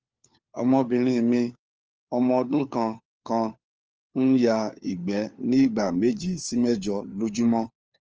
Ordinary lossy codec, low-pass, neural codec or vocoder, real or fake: Opus, 24 kbps; 7.2 kHz; codec, 16 kHz, 4 kbps, FunCodec, trained on LibriTTS, 50 frames a second; fake